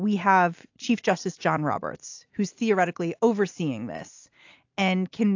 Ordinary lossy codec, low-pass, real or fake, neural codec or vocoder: AAC, 48 kbps; 7.2 kHz; real; none